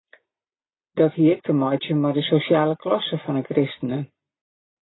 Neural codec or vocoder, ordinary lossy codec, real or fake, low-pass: none; AAC, 16 kbps; real; 7.2 kHz